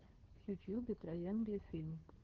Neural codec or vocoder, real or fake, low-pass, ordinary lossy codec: codec, 16 kHz, 2 kbps, FunCodec, trained on Chinese and English, 25 frames a second; fake; 7.2 kHz; Opus, 16 kbps